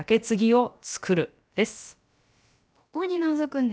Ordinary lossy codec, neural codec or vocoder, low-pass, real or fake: none; codec, 16 kHz, about 1 kbps, DyCAST, with the encoder's durations; none; fake